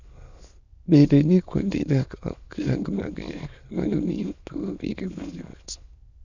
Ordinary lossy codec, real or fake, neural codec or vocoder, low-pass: Opus, 64 kbps; fake; autoencoder, 22.05 kHz, a latent of 192 numbers a frame, VITS, trained on many speakers; 7.2 kHz